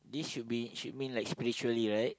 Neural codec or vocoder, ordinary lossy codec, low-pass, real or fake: none; none; none; real